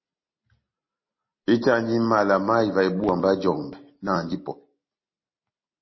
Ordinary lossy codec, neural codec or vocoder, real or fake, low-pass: MP3, 24 kbps; none; real; 7.2 kHz